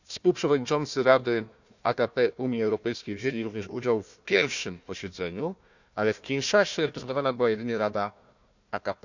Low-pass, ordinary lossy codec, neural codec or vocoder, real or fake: 7.2 kHz; none; codec, 16 kHz, 1 kbps, FunCodec, trained on Chinese and English, 50 frames a second; fake